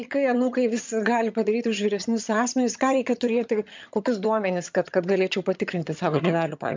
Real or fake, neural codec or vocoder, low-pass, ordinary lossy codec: fake; vocoder, 22.05 kHz, 80 mel bands, HiFi-GAN; 7.2 kHz; MP3, 64 kbps